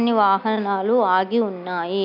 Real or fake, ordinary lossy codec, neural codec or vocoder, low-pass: real; none; none; 5.4 kHz